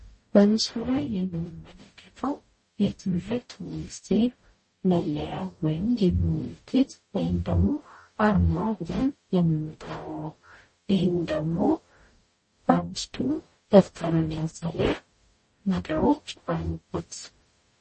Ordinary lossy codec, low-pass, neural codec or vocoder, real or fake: MP3, 32 kbps; 10.8 kHz; codec, 44.1 kHz, 0.9 kbps, DAC; fake